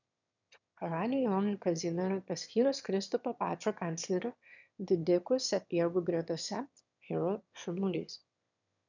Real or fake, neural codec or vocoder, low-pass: fake; autoencoder, 22.05 kHz, a latent of 192 numbers a frame, VITS, trained on one speaker; 7.2 kHz